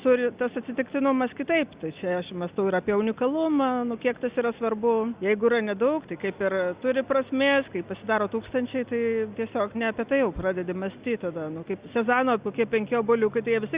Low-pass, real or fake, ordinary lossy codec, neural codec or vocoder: 3.6 kHz; real; Opus, 64 kbps; none